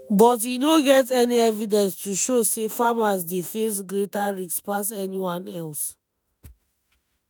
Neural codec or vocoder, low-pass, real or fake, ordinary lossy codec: autoencoder, 48 kHz, 32 numbers a frame, DAC-VAE, trained on Japanese speech; none; fake; none